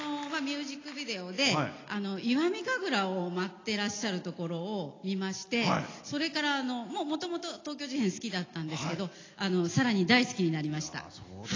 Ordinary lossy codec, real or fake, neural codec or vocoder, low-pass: AAC, 32 kbps; real; none; 7.2 kHz